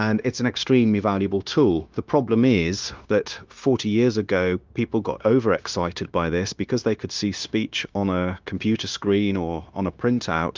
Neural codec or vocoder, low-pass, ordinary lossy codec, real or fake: codec, 16 kHz, 0.9 kbps, LongCat-Audio-Codec; 7.2 kHz; Opus, 24 kbps; fake